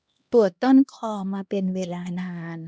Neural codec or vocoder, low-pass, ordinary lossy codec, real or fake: codec, 16 kHz, 1 kbps, X-Codec, HuBERT features, trained on LibriSpeech; none; none; fake